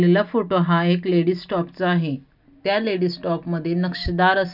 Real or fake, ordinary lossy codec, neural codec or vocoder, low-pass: real; none; none; 5.4 kHz